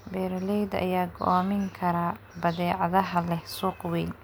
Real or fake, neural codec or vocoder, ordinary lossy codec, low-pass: real; none; none; none